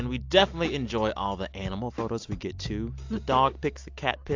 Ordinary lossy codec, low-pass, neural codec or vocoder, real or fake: AAC, 48 kbps; 7.2 kHz; none; real